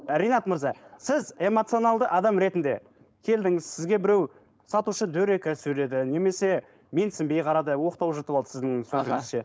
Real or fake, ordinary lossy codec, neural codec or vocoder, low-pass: fake; none; codec, 16 kHz, 4.8 kbps, FACodec; none